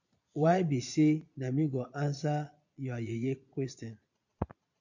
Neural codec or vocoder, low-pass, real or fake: vocoder, 22.05 kHz, 80 mel bands, Vocos; 7.2 kHz; fake